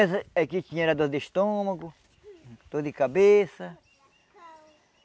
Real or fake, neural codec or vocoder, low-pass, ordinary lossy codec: real; none; none; none